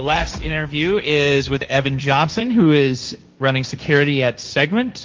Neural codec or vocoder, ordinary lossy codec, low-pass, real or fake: codec, 16 kHz, 1.1 kbps, Voila-Tokenizer; Opus, 32 kbps; 7.2 kHz; fake